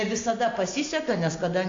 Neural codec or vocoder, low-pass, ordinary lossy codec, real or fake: none; 7.2 kHz; AAC, 48 kbps; real